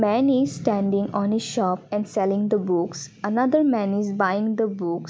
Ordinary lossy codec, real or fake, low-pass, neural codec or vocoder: none; real; none; none